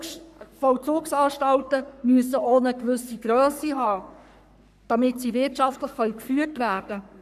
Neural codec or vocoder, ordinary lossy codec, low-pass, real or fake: codec, 44.1 kHz, 3.4 kbps, Pupu-Codec; none; 14.4 kHz; fake